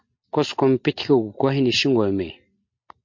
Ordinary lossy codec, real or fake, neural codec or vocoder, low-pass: MP3, 48 kbps; real; none; 7.2 kHz